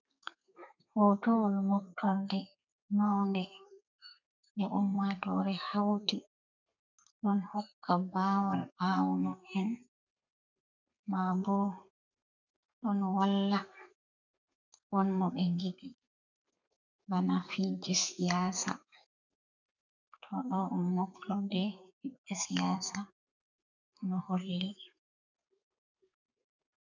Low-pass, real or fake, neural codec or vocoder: 7.2 kHz; fake; codec, 32 kHz, 1.9 kbps, SNAC